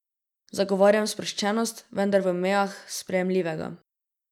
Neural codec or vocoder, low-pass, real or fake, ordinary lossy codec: none; 19.8 kHz; real; none